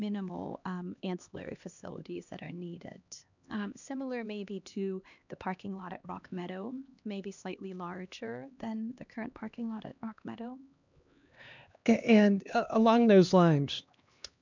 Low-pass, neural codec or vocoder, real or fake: 7.2 kHz; codec, 16 kHz, 2 kbps, X-Codec, HuBERT features, trained on LibriSpeech; fake